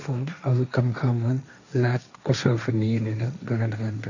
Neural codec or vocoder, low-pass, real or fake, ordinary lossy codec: codec, 16 kHz, 1.1 kbps, Voila-Tokenizer; 7.2 kHz; fake; none